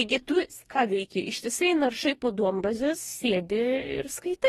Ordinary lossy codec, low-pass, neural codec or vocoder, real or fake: AAC, 32 kbps; 19.8 kHz; codec, 44.1 kHz, 2.6 kbps, DAC; fake